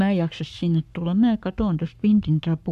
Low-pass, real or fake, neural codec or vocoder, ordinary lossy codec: 14.4 kHz; fake; codec, 44.1 kHz, 7.8 kbps, Pupu-Codec; none